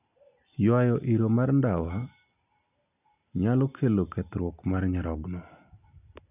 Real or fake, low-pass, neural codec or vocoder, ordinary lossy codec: real; 3.6 kHz; none; none